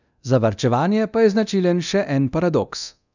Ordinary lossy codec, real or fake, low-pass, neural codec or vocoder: none; fake; 7.2 kHz; codec, 24 kHz, 0.9 kbps, DualCodec